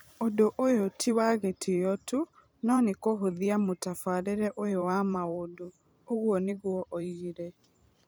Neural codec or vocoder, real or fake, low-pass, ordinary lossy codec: vocoder, 44.1 kHz, 128 mel bands every 512 samples, BigVGAN v2; fake; none; none